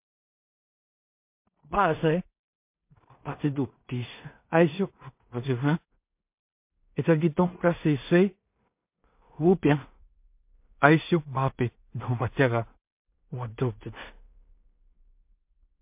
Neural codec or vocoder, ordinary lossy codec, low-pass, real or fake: codec, 16 kHz in and 24 kHz out, 0.4 kbps, LongCat-Audio-Codec, two codebook decoder; MP3, 32 kbps; 3.6 kHz; fake